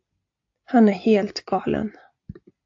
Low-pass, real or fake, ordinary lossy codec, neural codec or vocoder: 7.2 kHz; real; AAC, 48 kbps; none